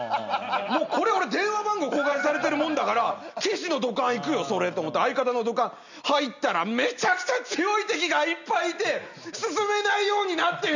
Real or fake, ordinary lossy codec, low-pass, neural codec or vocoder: real; none; 7.2 kHz; none